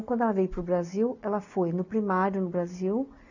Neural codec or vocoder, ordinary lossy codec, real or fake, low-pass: none; none; real; 7.2 kHz